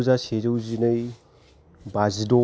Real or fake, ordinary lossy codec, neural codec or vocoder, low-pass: real; none; none; none